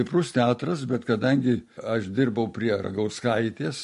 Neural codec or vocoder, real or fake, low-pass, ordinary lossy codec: none; real; 14.4 kHz; MP3, 48 kbps